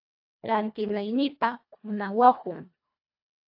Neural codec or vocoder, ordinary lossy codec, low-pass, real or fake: codec, 24 kHz, 1.5 kbps, HILCodec; AAC, 48 kbps; 5.4 kHz; fake